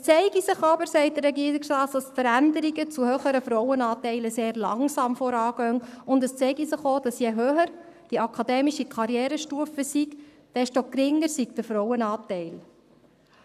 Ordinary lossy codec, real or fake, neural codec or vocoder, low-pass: none; fake; codec, 44.1 kHz, 7.8 kbps, Pupu-Codec; 14.4 kHz